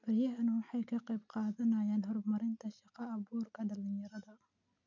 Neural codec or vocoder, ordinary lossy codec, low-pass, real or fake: none; none; 7.2 kHz; real